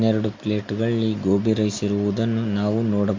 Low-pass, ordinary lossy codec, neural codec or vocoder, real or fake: 7.2 kHz; MP3, 48 kbps; none; real